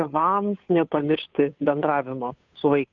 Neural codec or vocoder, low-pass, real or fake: codec, 16 kHz, 2 kbps, FunCodec, trained on Chinese and English, 25 frames a second; 7.2 kHz; fake